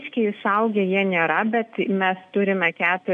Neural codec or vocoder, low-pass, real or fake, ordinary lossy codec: none; 9.9 kHz; real; AAC, 96 kbps